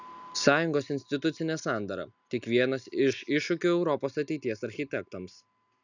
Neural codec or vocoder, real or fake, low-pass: none; real; 7.2 kHz